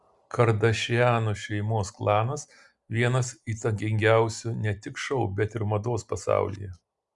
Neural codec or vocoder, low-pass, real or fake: none; 10.8 kHz; real